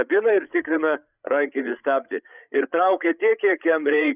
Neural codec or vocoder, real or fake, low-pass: codec, 16 kHz, 8 kbps, FreqCodec, larger model; fake; 3.6 kHz